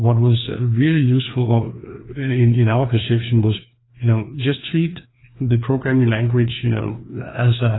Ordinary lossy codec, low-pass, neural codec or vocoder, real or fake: AAC, 16 kbps; 7.2 kHz; codec, 16 kHz, 2 kbps, FreqCodec, larger model; fake